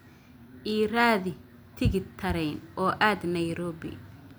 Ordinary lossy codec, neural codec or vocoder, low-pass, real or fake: none; none; none; real